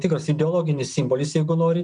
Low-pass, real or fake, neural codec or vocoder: 9.9 kHz; real; none